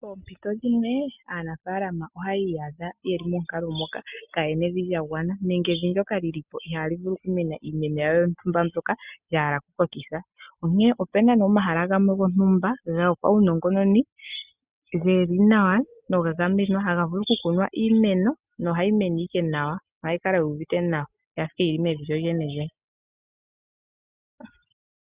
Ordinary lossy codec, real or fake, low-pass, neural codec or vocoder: Opus, 64 kbps; real; 3.6 kHz; none